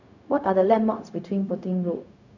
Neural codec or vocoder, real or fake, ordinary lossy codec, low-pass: codec, 16 kHz, 0.4 kbps, LongCat-Audio-Codec; fake; none; 7.2 kHz